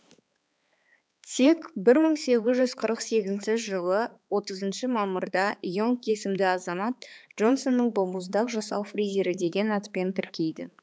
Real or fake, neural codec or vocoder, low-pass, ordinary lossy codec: fake; codec, 16 kHz, 4 kbps, X-Codec, HuBERT features, trained on balanced general audio; none; none